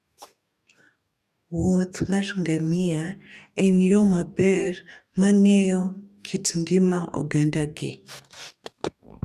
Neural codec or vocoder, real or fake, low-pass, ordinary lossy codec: codec, 44.1 kHz, 2.6 kbps, DAC; fake; 14.4 kHz; none